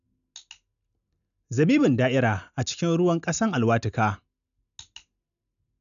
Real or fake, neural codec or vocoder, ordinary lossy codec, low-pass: real; none; none; 7.2 kHz